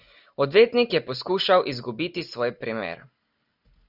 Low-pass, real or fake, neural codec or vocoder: 5.4 kHz; real; none